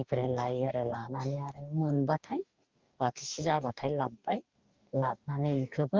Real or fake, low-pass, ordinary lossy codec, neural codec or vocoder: fake; 7.2 kHz; Opus, 32 kbps; codec, 44.1 kHz, 2.6 kbps, DAC